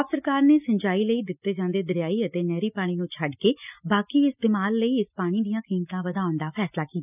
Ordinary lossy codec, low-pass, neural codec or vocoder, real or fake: none; 3.6 kHz; none; real